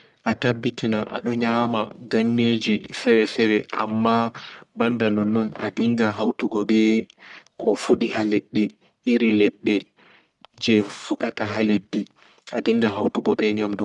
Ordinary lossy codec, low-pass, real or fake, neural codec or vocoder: none; 10.8 kHz; fake; codec, 44.1 kHz, 1.7 kbps, Pupu-Codec